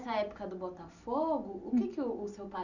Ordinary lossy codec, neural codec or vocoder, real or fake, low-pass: none; none; real; 7.2 kHz